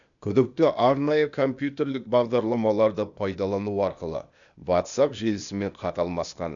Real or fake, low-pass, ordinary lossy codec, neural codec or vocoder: fake; 7.2 kHz; none; codec, 16 kHz, 0.8 kbps, ZipCodec